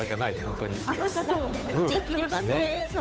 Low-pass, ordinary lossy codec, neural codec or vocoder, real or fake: none; none; codec, 16 kHz, 8 kbps, FunCodec, trained on Chinese and English, 25 frames a second; fake